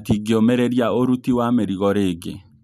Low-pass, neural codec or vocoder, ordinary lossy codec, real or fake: 14.4 kHz; none; MP3, 96 kbps; real